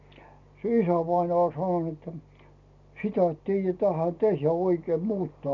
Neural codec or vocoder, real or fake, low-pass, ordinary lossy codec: none; real; 7.2 kHz; none